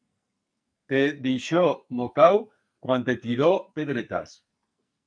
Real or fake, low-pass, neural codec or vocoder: fake; 9.9 kHz; codec, 44.1 kHz, 2.6 kbps, SNAC